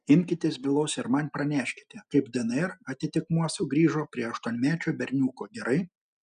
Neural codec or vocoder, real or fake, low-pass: none; real; 9.9 kHz